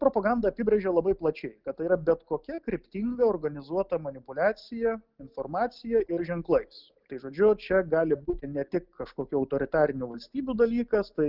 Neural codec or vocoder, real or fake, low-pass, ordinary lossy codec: none; real; 5.4 kHz; Opus, 32 kbps